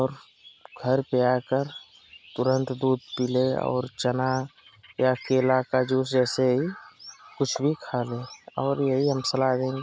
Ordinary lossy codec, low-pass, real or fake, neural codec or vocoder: none; none; real; none